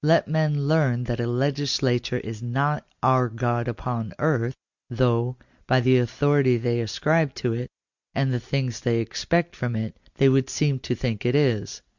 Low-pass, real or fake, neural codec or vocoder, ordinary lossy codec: 7.2 kHz; real; none; Opus, 64 kbps